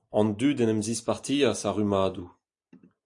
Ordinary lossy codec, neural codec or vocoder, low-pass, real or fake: AAC, 64 kbps; none; 10.8 kHz; real